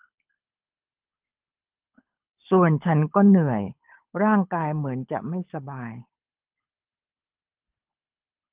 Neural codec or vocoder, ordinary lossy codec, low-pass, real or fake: codec, 24 kHz, 6 kbps, HILCodec; Opus, 32 kbps; 3.6 kHz; fake